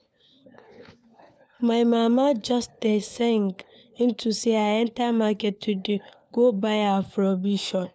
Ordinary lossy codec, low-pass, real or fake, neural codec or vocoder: none; none; fake; codec, 16 kHz, 4 kbps, FunCodec, trained on LibriTTS, 50 frames a second